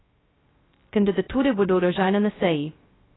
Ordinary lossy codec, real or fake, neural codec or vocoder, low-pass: AAC, 16 kbps; fake; codec, 16 kHz, 0.2 kbps, FocalCodec; 7.2 kHz